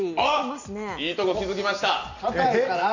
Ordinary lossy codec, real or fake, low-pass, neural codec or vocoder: none; real; 7.2 kHz; none